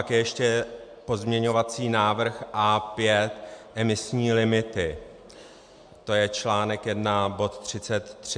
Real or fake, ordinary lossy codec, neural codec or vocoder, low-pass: real; MP3, 64 kbps; none; 9.9 kHz